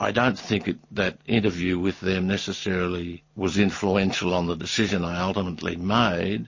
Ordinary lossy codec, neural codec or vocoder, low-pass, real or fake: MP3, 32 kbps; none; 7.2 kHz; real